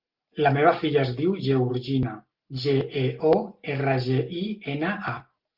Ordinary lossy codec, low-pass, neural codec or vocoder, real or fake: Opus, 32 kbps; 5.4 kHz; none; real